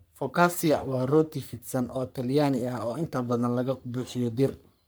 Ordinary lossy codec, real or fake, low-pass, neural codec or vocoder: none; fake; none; codec, 44.1 kHz, 3.4 kbps, Pupu-Codec